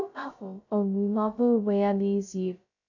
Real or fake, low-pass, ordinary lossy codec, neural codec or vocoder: fake; 7.2 kHz; none; codec, 16 kHz, 0.2 kbps, FocalCodec